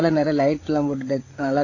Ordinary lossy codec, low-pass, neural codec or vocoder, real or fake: AAC, 32 kbps; 7.2 kHz; codec, 16 kHz, 8 kbps, FreqCodec, larger model; fake